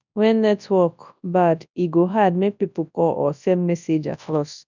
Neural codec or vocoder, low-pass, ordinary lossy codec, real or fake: codec, 24 kHz, 0.9 kbps, WavTokenizer, large speech release; 7.2 kHz; none; fake